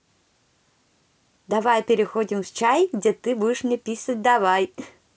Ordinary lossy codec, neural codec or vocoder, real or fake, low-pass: none; none; real; none